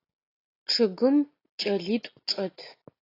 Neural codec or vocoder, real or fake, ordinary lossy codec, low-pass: none; real; AAC, 32 kbps; 5.4 kHz